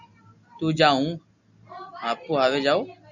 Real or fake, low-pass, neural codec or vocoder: real; 7.2 kHz; none